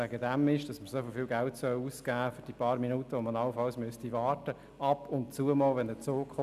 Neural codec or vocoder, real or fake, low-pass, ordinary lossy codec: none; real; 14.4 kHz; none